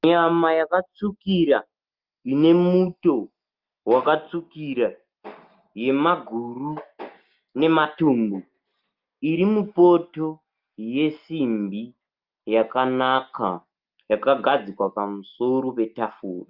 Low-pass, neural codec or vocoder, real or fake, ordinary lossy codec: 5.4 kHz; none; real; Opus, 24 kbps